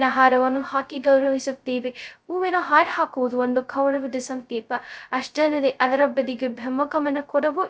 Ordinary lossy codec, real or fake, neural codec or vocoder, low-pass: none; fake; codec, 16 kHz, 0.2 kbps, FocalCodec; none